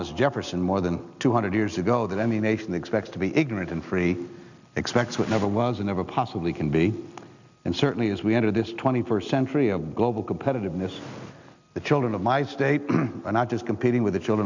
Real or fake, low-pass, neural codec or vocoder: real; 7.2 kHz; none